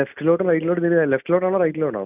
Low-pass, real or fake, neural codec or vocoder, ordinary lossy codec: 3.6 kHz; real; none; none